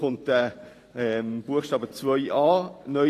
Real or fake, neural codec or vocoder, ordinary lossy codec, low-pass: fake; vocoder, 44.1 kHz, 128 mel bands every 512 samples, BigVGAN v2; AAC, 48 kbps; 14.4 kHz